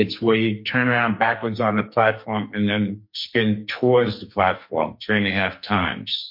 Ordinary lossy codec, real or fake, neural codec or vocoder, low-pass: MP3, 32 kbps; fake; codec, 44.1 kHz, 2.6 kbps, SNAC; 5.4 kHz